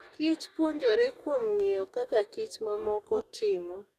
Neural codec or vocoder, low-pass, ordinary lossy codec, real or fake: codec, 44.1 kHz, 2.6 kbps, DAC; 14.4 kHz; none; fake